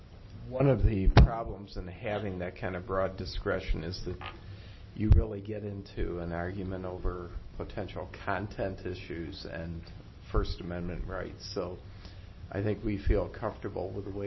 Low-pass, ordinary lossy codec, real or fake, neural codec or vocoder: 7.2 kHz; MP3, 24 kbps; real; none